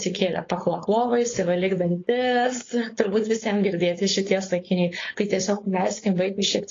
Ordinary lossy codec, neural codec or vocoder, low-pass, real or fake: AAC, 32 kbps; codec, 16 kHz, 4.8 kbps, FACodec; 7.2 kHz; fake